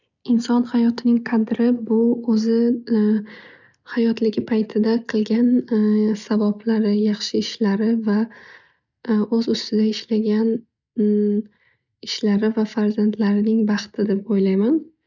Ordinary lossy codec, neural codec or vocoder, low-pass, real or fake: none; none; 7.2 kHz; real